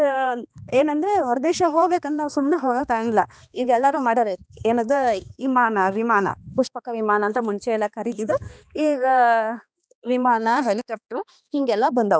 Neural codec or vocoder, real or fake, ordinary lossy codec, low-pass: codec, 16 kHz, 2 kbps, X-Codec, HuBERT features, trained on balanced general audio; fake; none; none